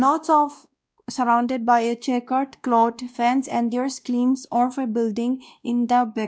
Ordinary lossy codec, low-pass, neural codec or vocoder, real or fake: none; none; codec, 16 kHz, 1 kbps, X-Codec, WavLM features, trained on Multilingual LibriSpeech; fake